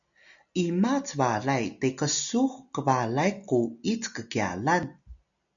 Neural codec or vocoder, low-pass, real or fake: none; 7.2 kHz; real